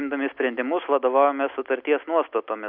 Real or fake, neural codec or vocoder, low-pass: real; none; 5.4 kHz